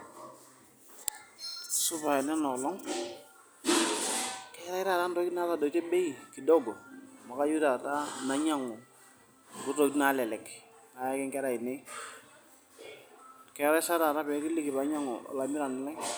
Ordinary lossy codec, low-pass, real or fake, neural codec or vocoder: none; none; real; none